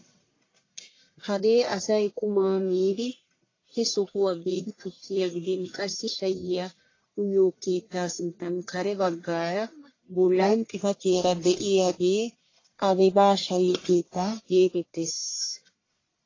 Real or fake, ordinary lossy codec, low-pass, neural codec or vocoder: fake; AAC, 32 kbps; 7.2 kHz; codec, 44.1 kHz, 1.7 kbps, Pupu-Codec